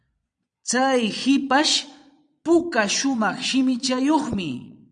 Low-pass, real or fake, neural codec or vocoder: 9.9 kHz; fake; vocoder, 22.05 kHz, 80 mel bands, Vocos